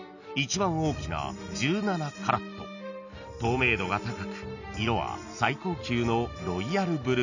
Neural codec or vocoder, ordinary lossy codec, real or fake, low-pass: none; none; real; 7.2 kHz